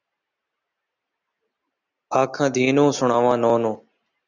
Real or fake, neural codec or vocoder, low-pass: real; none; 7.2 kHz